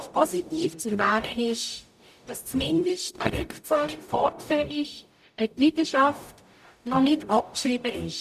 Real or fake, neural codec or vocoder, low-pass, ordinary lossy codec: fake; codec, 44.1 kHz, 0.9 kbps, DAC; 14.4 kHz; none